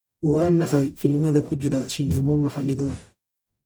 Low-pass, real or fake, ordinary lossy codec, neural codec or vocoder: none; fake; none; codec, 44.1 kHz, 0.9 kbps, DAC